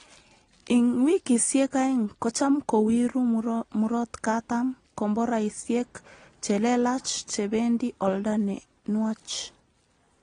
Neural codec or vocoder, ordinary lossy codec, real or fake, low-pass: none; AAC, 32 kbps; real; 9.9 kHz